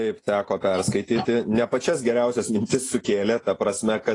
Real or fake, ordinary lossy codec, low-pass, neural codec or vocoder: real; AAC, 32 kbps; 10.8 kHz; none